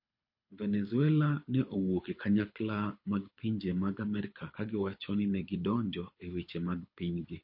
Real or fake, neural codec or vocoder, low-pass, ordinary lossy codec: fake; codec, 24 kHz, 6 kbps, HILCodec; 5.4 kHz; MP3, 32 kbps